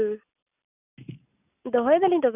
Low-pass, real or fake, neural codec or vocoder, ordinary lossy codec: 3.6 kHz; real; none; none